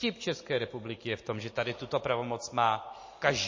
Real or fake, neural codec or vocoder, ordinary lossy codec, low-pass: real; none; MP3, 32 kbps; 7.2 kHz